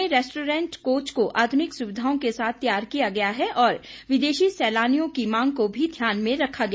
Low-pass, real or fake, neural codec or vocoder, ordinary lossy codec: none; real; none; none